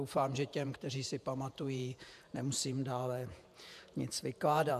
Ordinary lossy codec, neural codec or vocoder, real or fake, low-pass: MP3, 96 kbps; vocoder, 48 kHz, 128 mel bands, Vocos; fake; 14.4 kHz